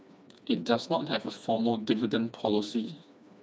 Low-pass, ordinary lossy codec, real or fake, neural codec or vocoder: none; none; fake; codec, 16 kHz, 2 kbps, FreqCodec, smaller model